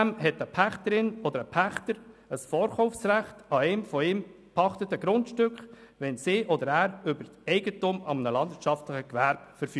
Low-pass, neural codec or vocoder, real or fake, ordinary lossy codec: none; none; real; none